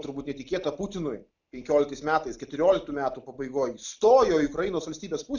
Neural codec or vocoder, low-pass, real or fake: none; 7.2 kHz; real